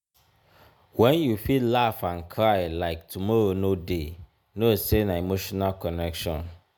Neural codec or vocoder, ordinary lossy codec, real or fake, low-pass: none; none; real; none